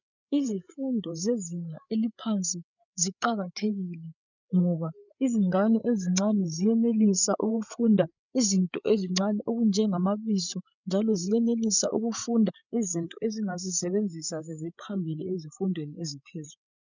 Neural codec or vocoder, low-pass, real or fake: codec, 16 kHz, 8 kbps, FreqCodec, larger model; 7.2 kHz; fake